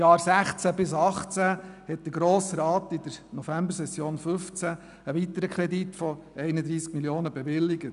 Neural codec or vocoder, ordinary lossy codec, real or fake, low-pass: none; none; real; 10.8 kHz